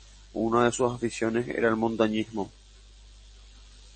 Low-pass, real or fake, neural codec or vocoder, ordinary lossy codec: 9.9 kHz; real; none; MP3, 32 kbps